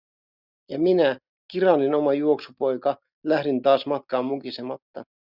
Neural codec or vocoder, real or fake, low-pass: none; real; 5.4 kHz